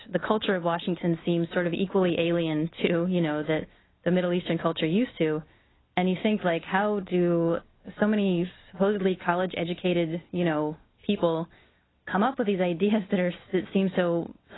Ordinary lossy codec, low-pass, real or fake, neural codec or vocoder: AAC, 16 kbps; 7.2 kHz; real; none